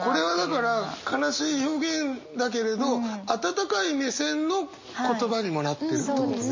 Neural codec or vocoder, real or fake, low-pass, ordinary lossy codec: none; real; 7.2 kHz; MP3, 32 kbps